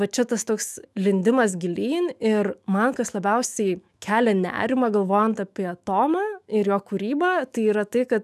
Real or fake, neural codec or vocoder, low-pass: fake; autoencoder, 48 kHz, 128 numbers a frame, DAC-VAE, trained on Japanese speech; 14.4 kHz